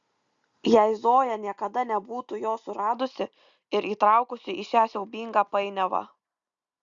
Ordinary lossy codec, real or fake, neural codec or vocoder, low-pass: Opus, 64 kbps; real; none; 7.2 kHz